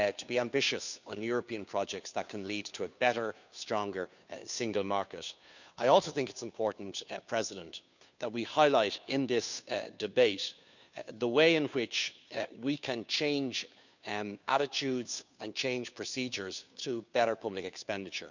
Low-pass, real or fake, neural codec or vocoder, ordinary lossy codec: 7.2 kHz; fake; codec, 16 kHz, 2 kbps, FunCodec, trained on Chinese and English, 25 frames a second; none